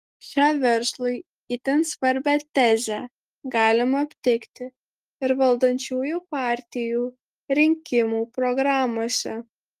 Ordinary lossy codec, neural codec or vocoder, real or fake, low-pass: Opus, 24 kbps; none; real; 14.4 kHz